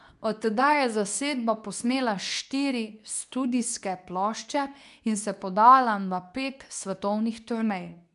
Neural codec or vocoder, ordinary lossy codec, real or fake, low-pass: codec, 24 kHz, 0.9 kbps, WavTokenizer, medium speech release version 2; none; fake; 10.8 kHz